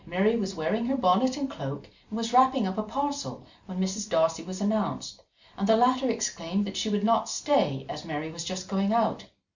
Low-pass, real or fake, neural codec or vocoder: 7.2 kHz; real; none